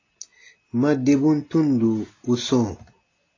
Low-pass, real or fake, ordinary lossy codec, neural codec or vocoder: 7.2 kHz; real; AAC, 32 kbps; none